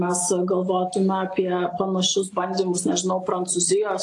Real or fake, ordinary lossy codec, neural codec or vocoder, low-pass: real; AAC, 48 kbps; none; 10.8 kHz